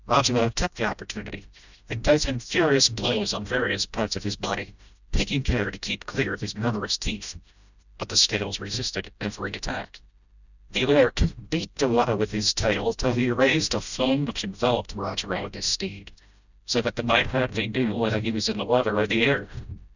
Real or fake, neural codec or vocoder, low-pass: fake; codec, 16 kHz, 0.5 kbps, FreqCodec, smaller model; 7.2 kHz